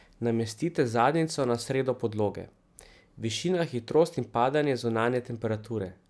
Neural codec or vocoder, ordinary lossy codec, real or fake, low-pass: none; none; real; none